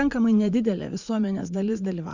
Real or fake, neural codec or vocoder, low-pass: fake; vocoder, 44.1 kHz, 80 mel bands, Vocos; 7.2 kHz